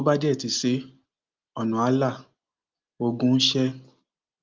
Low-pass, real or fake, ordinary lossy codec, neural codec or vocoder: 7.2 kHz; real; Opus, 32 kbps; none